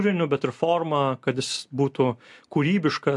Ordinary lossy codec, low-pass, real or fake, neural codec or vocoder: MP3, 64 kbps; 10.8 kHz; real; none